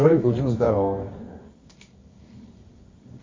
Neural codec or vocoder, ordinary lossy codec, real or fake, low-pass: codec, 24 kHz, 0.9 kbps, WavTokenizer, medium music audio release; MP3, 32 kbps; fake; 7.2 kHz